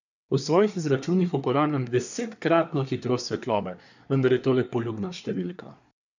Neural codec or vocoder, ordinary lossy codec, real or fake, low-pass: codec, 24 kHz, 1 kbps, SNAC; none; fake; 7.2 kHz